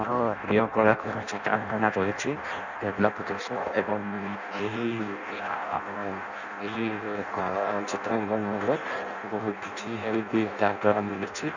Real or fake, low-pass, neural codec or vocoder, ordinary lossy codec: fake; 7.2 kHz; codec, 16 kHz in and 24 kHz out, 0.6 kbps, FireRedTTS-2 codec; none